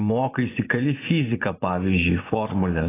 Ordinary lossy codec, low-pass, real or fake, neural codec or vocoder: AAC, 16 kbps; 3.6 kHz; fake; vocoder, 24 kHz, 100 mel bands, Vocos